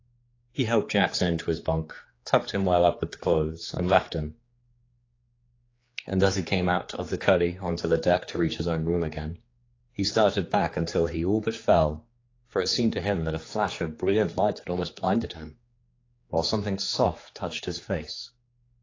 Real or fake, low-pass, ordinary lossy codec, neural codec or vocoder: fake; 7.2 kHz; AAC, 32 kbps; codec, 16 kHz, 4 kbps, X-Codec, HuBERT features, trained on general audio